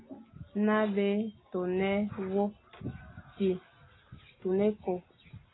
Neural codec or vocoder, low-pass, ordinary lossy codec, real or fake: none; 7.2 kHz; AAC, 16 kbps; real